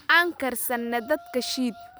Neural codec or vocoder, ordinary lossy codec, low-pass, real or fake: vocoder, 44.1 kHz, 128 mel bands every 512 samples, BigVGAN v2; none; none; fake